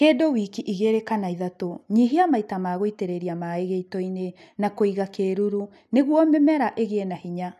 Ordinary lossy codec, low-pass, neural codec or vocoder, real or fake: none; 14.4 kHz; none; real